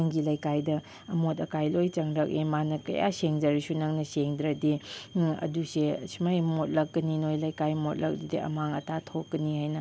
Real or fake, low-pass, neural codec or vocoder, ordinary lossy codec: real; none; none; none